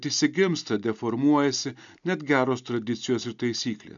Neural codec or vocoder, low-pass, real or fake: none; 7.2 kHz; real